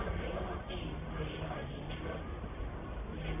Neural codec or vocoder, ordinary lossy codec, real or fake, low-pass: codec, 44.1 kHz, 1.7 kbps, Pupu-Codec; none; fake; 3.6 kHz